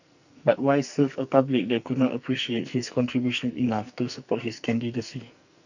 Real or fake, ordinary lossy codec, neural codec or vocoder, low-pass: fake; none; codec, 44.1 kHz, 2.6 kbps, SNAC; 7.2 kHz